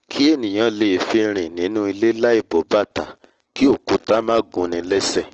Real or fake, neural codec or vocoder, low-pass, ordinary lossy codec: real; none; 7.2 kHz; Opus, 16 kbps